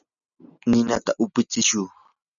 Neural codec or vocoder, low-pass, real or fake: none; 7.2 kHz; real